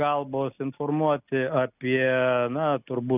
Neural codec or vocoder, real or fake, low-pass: none; real; 3.6 kHz